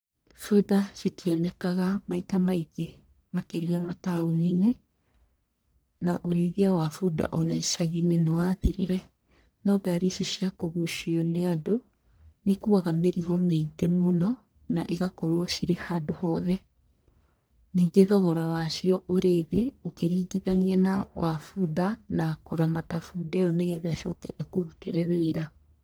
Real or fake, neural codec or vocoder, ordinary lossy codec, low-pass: fake; codec, 44.1 kHz, 1.7 kbps, Pupu-Codec; none; none